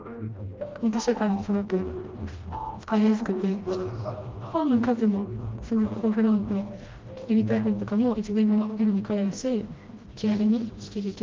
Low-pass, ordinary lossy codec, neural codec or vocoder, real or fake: 7.2 kHz; Opus, 32 kbps; codec, 16 kHz, 1 kbps, FreqCodec, smaller model; fake